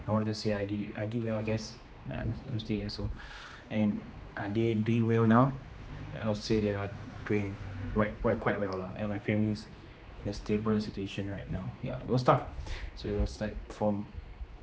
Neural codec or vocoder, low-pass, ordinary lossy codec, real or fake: codec, 16 kHz, 2 kbps, X-Codec, HuBERT features, trained on general audio; none; none; fake